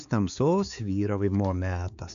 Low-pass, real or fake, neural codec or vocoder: 7.2 kHz; fake; codec, 16 kHz, 4 kbps, X-Codec, HuBERT features, trained on balanced general audio